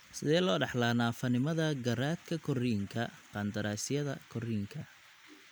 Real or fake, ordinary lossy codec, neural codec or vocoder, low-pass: real; none; none; none